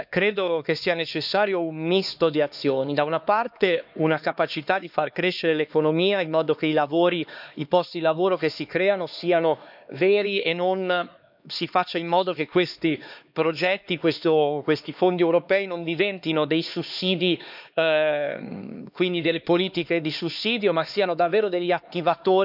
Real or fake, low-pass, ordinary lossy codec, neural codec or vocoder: fake; 5.4 kHz; none; codec, 16 kHz, 2 kbps, X-Codec, HuBERT features, trained on LibriSpeech